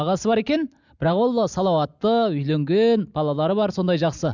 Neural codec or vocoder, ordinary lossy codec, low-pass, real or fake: none; none; 7.2 kHz; real